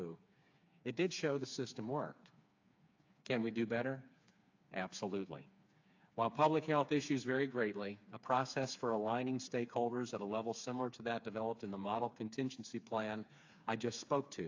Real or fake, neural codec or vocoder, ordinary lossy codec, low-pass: fake; codec, 16 kHz, 4 kbps, FreqCodec, smaller model; AAC, 48 kbps; 7.2 kHz